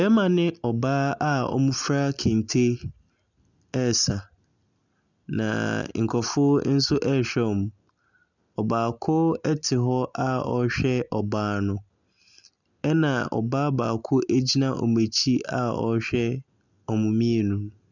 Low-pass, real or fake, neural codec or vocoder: 7.2 kHz; real; none